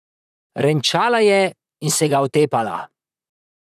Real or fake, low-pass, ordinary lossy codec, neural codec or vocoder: fake; 14.4 kHz; none; vocoder, 44.1 kHz, 128 mel bands, Pupu-Vocoder